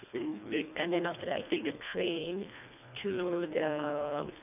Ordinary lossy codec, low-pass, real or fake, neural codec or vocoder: none; 3.6 kHz; fake; codec, 24 kHz, 1.5 kbps, HILCodec